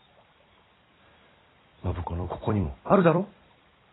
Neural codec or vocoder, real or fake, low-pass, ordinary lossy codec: vocoder, 44.1 kHz, 128 mel bands every 256 samples, BigVGAN v2; fake; 7.2 kHz; AAC, 16 kbps